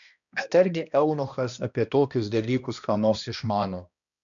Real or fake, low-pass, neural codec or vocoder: fake; 7.2 kHz; codec, 16 kHz, 1 kbps, X-Codec, HuBERT features, trained on balanced general audio